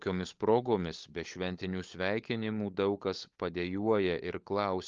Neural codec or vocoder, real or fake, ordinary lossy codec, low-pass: codec, 16 kHz, 16 kbps, FunCodec, trained on LibriTTS, 50 frames a second; fake; Opus, 32 kbps; 7.2 kHz